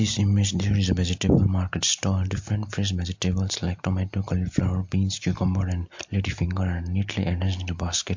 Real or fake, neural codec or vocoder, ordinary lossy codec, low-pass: real; none; MP3, 48 kbps; 7.2 kHz